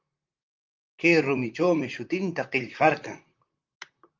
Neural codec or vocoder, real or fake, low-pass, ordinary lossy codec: vocoder, 44.1 kHz, 128 mel bands, Pupu-Vocoder; fake; 7.2 kHz; Opus, 32 kbps